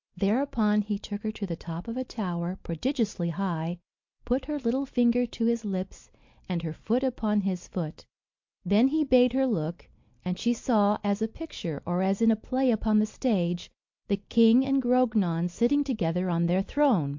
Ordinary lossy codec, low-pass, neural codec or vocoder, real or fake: MP3, 48 kbps; 7.2 kHz; none; real